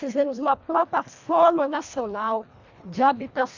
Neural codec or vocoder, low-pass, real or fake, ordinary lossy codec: codec, 24 kHz, 1.5 kbps, HILCodec; 7.2 kHz; fake; Opus, 64 kbps